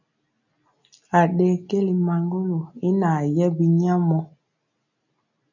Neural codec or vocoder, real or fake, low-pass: none; real; 7.2 kHz